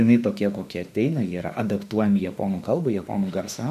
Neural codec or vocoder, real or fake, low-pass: autoencoder, 48 kHz, 32 numbers a frame, DAC-VAE, trained on Japanese speech; fake; 14.4 kHz